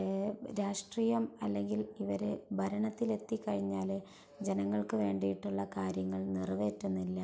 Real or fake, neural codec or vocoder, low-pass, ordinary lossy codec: real; none; none; none